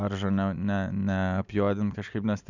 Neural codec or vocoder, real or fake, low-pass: none; real; 7.2 kHz